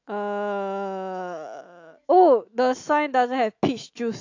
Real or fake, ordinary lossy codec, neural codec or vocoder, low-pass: fake; AAC, 48 kbps; autoencoder, 48 kHz, 128 numbers a frame, DAC-VAE, trained on Japanese speech; 7.2 kHz